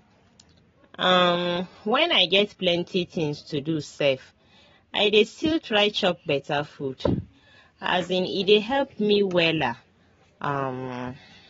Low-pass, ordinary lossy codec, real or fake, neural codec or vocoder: 7.2 kHz; AAC, 24 kbps; real; none